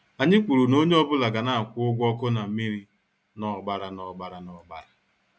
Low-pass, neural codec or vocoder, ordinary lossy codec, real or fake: none; none; none; real